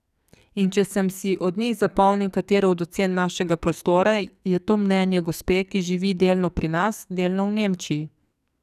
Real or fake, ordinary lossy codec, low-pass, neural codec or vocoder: fake; none; 14.4 kHz; codec, 44.1 kHz, 2.6 kbps, SNAC